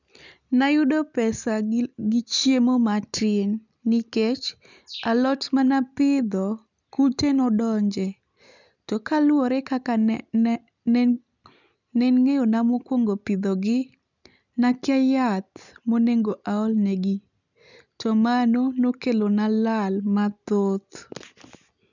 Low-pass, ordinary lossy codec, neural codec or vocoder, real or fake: 7.2 kHz; none; none; real